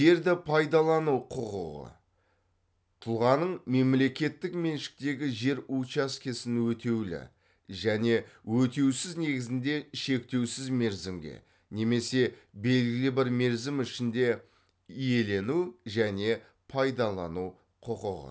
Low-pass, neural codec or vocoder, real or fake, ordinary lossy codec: none; none; real; none